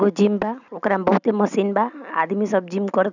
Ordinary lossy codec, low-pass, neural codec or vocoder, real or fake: none; 7.2 kHz; none; real